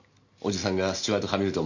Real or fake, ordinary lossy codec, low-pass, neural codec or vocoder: real; none; 7.2 kHz; none